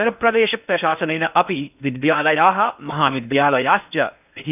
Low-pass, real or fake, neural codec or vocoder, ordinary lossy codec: 3.6 kHz; fake; codec, 16 kHz in and 24 kHz out, 0.6 kbps, FocalCodec, streaming, 4096 codes; none